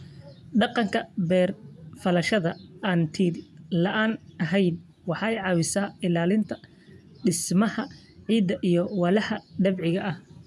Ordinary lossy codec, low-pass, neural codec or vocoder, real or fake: none; none; vocoder, 24 kHz, 100 mel bands, Vocos; fake